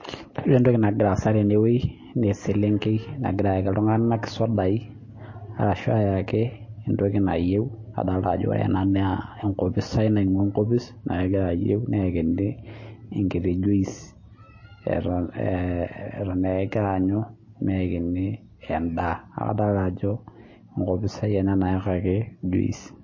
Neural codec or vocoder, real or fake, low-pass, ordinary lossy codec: none; real; 7.2 kHz; MP3, 32 kbps